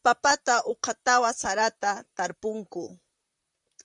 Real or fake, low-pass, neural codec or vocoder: fake; 10.8 kHz; vocoder, 44.1 kHz, 128 mel bands, Pupu-Vocoder